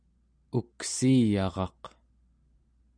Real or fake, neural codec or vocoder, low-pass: real; none; 9.9 kHz